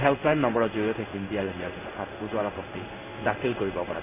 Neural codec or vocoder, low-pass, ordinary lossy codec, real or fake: codec, 16 kHz in and 24 kHz out, 1 kbps, XY-Tokenizer; 3.6 kHz; none; fake